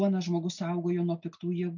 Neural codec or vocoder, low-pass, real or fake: none; 7.2 kHz; real